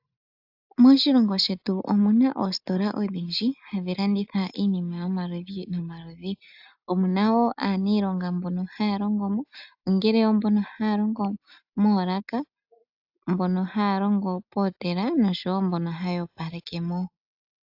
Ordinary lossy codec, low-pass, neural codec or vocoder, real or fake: AAC, 48 kbps; 5.4 kHz; none; real